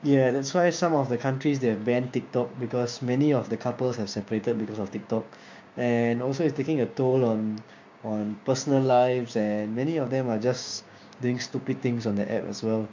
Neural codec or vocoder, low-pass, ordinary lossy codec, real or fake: codec, 16 kHz, 6 kbps, DAC; 7.2 kHz; MP3, 48 kbps; fake